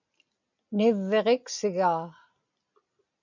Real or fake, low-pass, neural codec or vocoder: real; 7.2 kHz; none